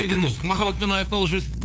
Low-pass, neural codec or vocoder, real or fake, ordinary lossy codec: none; codec, 16 kHz, 2 kbps, FunCodec, trained on LibriTTS, 25 frames a second; fake; none